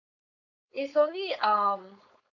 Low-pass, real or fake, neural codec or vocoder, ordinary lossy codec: 7.2 kHz; fake; codec, 16 kHz, 4.8 kbps, FACodec; none